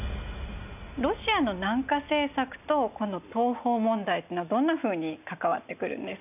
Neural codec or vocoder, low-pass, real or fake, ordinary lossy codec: none; 3.6 kHz; real; none